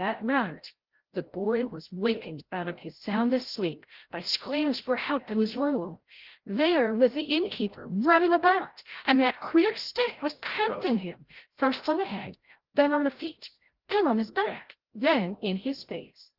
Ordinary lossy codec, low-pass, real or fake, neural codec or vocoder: Opus, 16 kbps; 5.4 kHz; fake; codec, 16 kHz, 0.5 kbps, FreqCodec, larger model